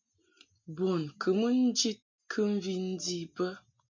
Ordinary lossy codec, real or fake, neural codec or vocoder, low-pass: MP3, 64 kbps; real; none; 7.2 kHz